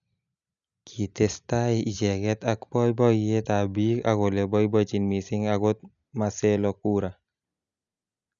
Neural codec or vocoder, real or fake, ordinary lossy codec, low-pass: none; real; none; 7.2 kHz